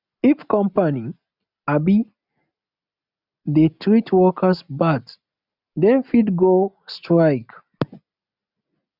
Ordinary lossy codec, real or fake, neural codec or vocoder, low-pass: none; real; none; 5.4 kHz